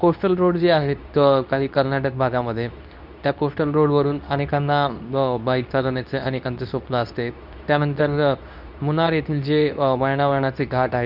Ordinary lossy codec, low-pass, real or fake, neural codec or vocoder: none; 5.4 kHz; fake; codec, 24 kHz, 0.9 kbps, WavTokenizer, medium speech release version 2